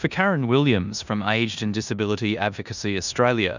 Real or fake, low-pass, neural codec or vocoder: fake; 7.2 kHz; codec, 16 kHz in and 24 kHz out, 0.9 kbps, LongCat-Audio-Codec, four codebook decoder